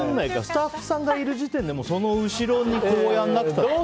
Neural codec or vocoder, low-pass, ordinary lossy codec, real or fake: none; none; none; real